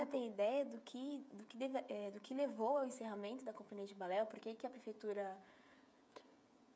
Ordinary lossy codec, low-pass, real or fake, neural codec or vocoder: none; none; fake; codec, 16 kHz, 16 kbps, FreqCodec, smaller model